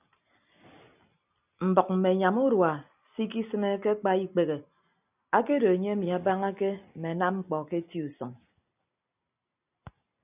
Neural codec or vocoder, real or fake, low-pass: none; real; 3.6 kHz